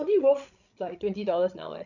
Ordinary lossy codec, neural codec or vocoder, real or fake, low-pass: none; codec, 16 kHz, 16 kbps, FreqCodec, larger model; fake; 7.2 kHz